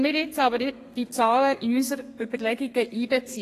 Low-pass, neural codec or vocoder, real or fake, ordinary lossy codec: 14.4 kHz; codec, 32 kHz, 1.9 kbps, SNAC; fake; AAC, 48 kbps